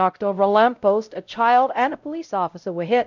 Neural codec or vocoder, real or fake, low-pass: codec, 16 kHz, 0.5 kbps, X-Codec, WavLM features, trained on Multilingual LibriSpeech; fake; 7.2 kHz